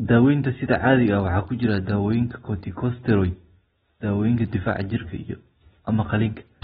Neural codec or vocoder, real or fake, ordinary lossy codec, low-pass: none; real; AAC, 16 kbps; 19.8 kHz